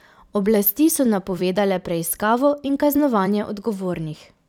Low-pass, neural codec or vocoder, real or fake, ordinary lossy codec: 19.8 kHz; vocoder, 44.1 kHz, 128 mel bands every 256 samples, BigVGAN v2; fake; none